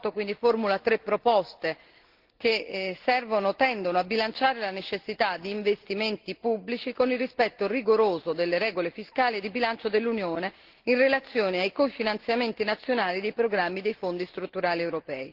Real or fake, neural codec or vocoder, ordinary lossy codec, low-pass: real; none; Opus, 16 kbps; 5.4 kHz